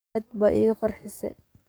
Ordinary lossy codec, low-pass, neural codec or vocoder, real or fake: none; none; codec, 44.1 kHz, 7.8 kbps, DAC; fake